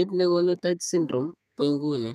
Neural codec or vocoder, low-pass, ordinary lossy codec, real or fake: codec, 32 kHz, 1.9 kbps, SNAC; 14.4 kHz; none; fake